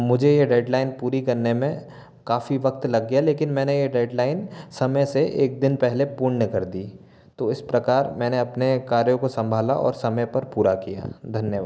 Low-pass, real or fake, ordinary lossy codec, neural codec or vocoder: none; real; none; none